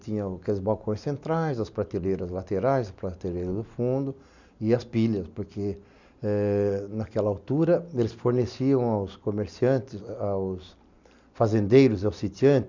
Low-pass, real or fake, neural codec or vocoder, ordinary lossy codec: 7.2 kHz; real; none; none